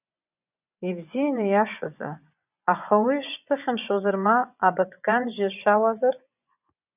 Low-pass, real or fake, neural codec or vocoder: 3.6 kHz; fake; vocoder, 24 kHz, 100 mel bands, Vocos